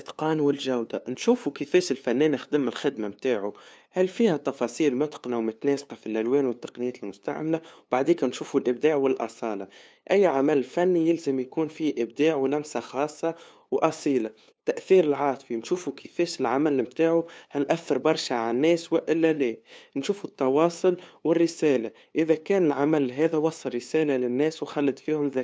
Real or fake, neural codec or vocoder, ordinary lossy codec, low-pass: fake; codec, 16 kHz, 2 kbps, FunCodec, trained on LibriTTS, 25 frames a second; none; none